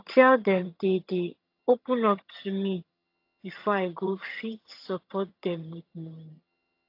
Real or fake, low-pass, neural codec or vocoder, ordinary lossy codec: fake; 5.4 kHz; vocoder, 22.05 kHz, 80 mel bands, HiFi-GAN; AAC, 32 kbps